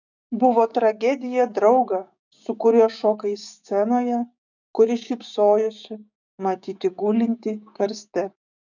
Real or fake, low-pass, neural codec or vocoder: fake; 7.2 kHz; codec, 16 kHz, 6 kbps, DAC